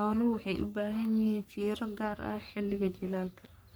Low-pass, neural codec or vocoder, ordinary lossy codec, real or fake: none; codec, 44.1 kHz, 3.4 kbps, Pupu-Codec; none; fake